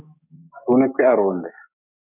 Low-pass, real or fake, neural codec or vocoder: 3.6 kHz; fake; codec, 44.1 kHz, 7.8 kbps, DAC